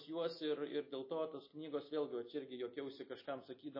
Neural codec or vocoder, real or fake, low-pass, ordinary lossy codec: none; real; 5.4 kHz; MP3, 24 kbps